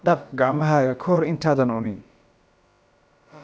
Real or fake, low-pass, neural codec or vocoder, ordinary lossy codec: fake; none; codec, 16 kHz, about 1 kbps, DyCAST, with the encoder's durations; none